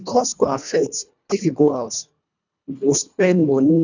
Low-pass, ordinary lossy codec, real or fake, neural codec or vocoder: 7.2 kHz; none; fake; codec, 24 kHz, 1.5 kbps, HILCodec